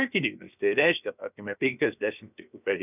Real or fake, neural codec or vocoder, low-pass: fake; codec, 16 kHz, 0.7 kbps, FocalCodec; 3.6 kHz